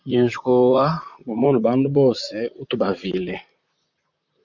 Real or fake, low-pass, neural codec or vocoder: fake; 7.2 kHz; vocoder, 44.1 kHz, 128 mel bands, Pupu-Vocoder